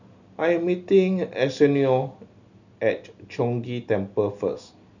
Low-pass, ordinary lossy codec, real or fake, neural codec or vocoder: 7.2 kHz; none; real; none